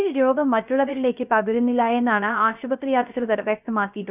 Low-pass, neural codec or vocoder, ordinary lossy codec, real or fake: 3.6 kHz; codec, 16 kHz, 0.3 kbps, FocalCodec; none; fake